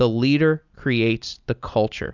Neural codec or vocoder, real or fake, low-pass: none; real; 7.2 kHz